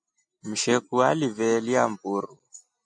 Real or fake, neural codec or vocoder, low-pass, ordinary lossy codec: real; none; 9.9 kHz; AAC, 64 kbps